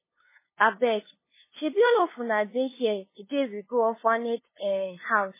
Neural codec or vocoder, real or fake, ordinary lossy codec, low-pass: codec, 16 kHz, 2 kbps, FunCodec, trained on LibriTTS, 25 frames a second; fake; MP3, 16 kbps; 3.6 kHz